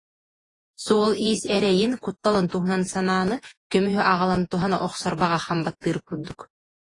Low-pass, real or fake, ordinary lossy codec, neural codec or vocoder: 10.8 kHz; fake; AAC, 32 kbps; vocoder, 48 kHz, 128 mel bands, Vocos